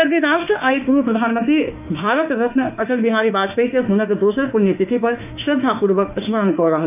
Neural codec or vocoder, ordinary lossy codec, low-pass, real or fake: autoencoder, 48 kHz, 32 numbers a frame, DAC-VAE, trained on Japanese speech; none; 3.6 kHz; fake